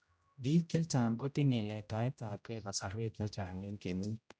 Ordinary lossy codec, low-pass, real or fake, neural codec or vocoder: none; none; fake; codec, 16 kHz, 0.5 kbps, X-Codec, HuBERT features, trained on general audio